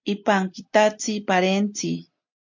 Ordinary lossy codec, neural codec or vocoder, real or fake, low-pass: MP3, 48 kbps; none; real; 7.2 kHz